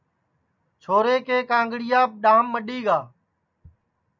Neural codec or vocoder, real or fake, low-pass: none; real; 7.2 kHz